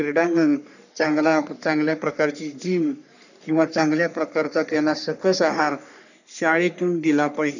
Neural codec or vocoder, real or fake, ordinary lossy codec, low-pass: codec, 44.1 kHz, 3.4 kbps, Pupu-Codec; fake; none; 7.2 kHz